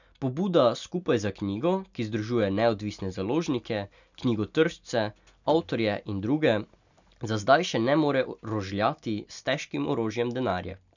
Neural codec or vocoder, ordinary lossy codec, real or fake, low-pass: none; none; real; 7.2 kHz